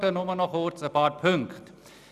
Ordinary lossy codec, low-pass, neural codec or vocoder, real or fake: none; 14.4 kHz; none; real